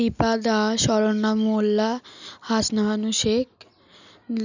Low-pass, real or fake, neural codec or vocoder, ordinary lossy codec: 7.2 kHz; real; none; none